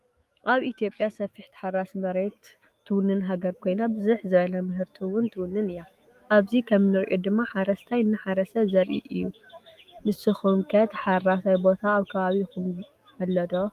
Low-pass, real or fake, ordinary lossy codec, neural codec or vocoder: 14.4 kHz; fake; Opus, 32 kbps; autoencoder, 48 kHz, 128 numbers a frame, DAC-VAE, trained on Japanese speech